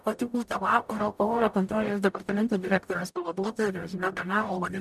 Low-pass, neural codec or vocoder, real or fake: 14.4 kHz; codec, 44.1 kHz, 0.9 kbps, DAC; fake